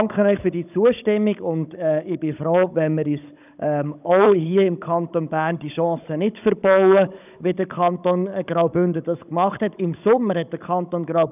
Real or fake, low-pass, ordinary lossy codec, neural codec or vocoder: fake; 3.6 kHz; none; codec, 16 kHz, 16 kbps, FunCodec, trained on Chinese and English, 50 frames a second